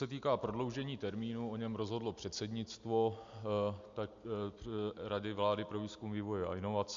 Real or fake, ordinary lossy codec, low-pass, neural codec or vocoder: real; MP3, 64 kbps; 7.2 kHz; none